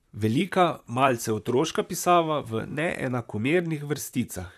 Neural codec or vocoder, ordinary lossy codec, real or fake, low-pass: vocoder, 44.1 kHz, 128 mel bands, Pupu-Vocoder; none; fake; 14.4 kHz